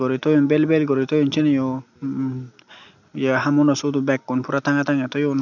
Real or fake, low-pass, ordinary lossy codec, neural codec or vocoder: real; 7.2 kHz; none; none